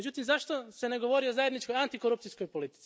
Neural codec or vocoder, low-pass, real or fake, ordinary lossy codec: none; none; real; none